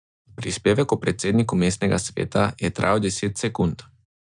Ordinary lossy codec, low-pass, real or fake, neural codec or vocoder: none; 9.9 kHz; real; none